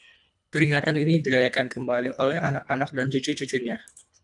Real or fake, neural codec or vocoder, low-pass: fake; codec, 24 kHz, 1.5 kbps, HILCodec; 10.8 kHz